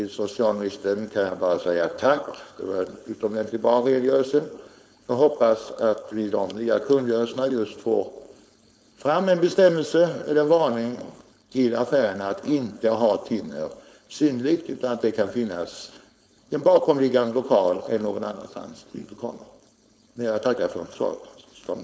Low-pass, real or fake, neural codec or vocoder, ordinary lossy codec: none; fake; codec, 16 kHz, 4.8 kbps, FACodec; none